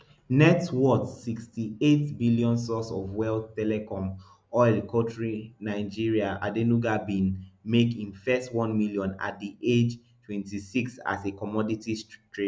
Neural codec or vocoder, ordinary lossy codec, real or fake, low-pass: none; none; real; none